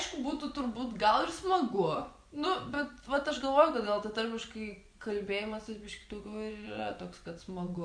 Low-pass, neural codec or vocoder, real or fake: 9.9 kHz; vocoder, 24 kHz, 100 mel bands, Vocos; fake